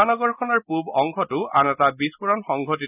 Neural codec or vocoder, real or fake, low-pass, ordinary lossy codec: none; real; 3.6 kHz; none